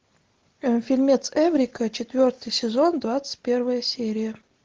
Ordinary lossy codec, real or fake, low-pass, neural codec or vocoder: Opus, 16 kbps; real; 7.2 kHz; none